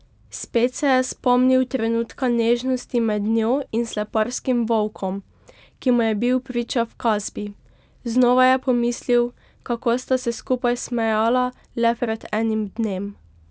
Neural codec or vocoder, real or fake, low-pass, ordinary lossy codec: none; real; none; none